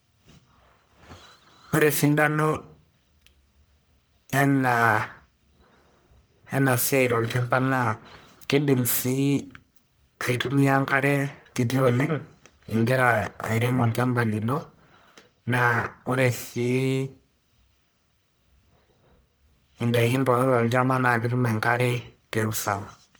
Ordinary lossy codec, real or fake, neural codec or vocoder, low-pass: none; fake; codec, 44.1 kHz, 1.7 kbps, Pupu-Codec; none